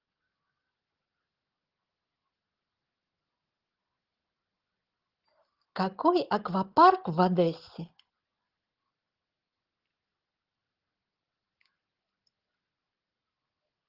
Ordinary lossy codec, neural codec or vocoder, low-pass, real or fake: Opus, 16 kbps; none; 5.4 kHz; real